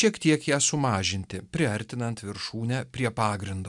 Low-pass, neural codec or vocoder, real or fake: 10.8 kHz; none; real